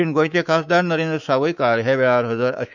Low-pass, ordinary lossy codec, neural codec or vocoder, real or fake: 7.2 kHz; none; codec, 24 kHz, 3.1 kbps, DualCodec; fake